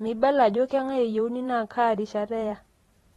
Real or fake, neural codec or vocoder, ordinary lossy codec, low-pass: fake; vocoder, 44.1 kHz, 128 mel bands every 512 samples, BigVGAN v2; AAC, 32 kbps; 19.8 kHz